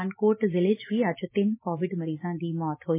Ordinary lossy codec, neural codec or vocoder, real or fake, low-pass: MP3, 16 kbps; none; real; 3.6 kHz